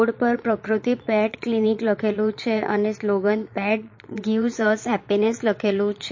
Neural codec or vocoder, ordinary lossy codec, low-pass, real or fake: vocoder, 22.05 kHz, 80 mel bands, Vocos; MP3, 32 kbps; 7.2 kHz; fake